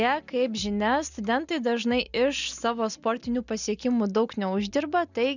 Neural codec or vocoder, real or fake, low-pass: none; real; 7.2 kHz